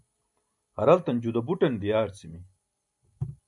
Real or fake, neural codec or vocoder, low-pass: real; none; 10.8 kHz